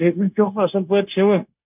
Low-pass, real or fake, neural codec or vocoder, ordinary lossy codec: 3.6 kHz; fake; codec, 16 kHz, 0.9 kbps, LongCat-Audio-Codec; none